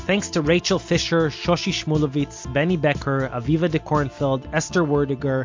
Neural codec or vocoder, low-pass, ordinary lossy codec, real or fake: none; 7.2 kHz; MP3, 48 kbps; real